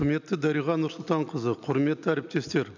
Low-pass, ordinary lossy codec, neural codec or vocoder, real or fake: 7.2 kHz; none; none; real